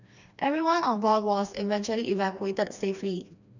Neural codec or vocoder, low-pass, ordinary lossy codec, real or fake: codec, 16 kHz, 2 kbps, FreqCodec, smaller model; 7.2 kHz; none; fake